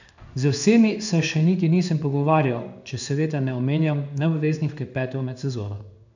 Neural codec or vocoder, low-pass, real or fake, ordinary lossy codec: codec, 16 kHz in and 24 kHz out, 1 kbps, XY-Tokenizer; 7.2 kHz; fake; none